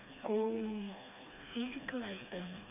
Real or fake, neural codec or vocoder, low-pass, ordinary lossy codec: fake; codec, 16 kHz, 2 kbps, FreqCodec, smaller model; 3.6 kHz; none